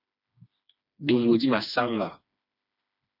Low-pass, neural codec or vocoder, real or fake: 5.4 kHz; codec, 16 kHz, 2 kbps, FreqCodec, smaller model; fake